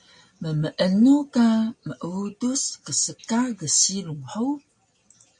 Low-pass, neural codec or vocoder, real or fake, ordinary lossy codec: 9.9 kHz; none; real; MP3, 48 kbps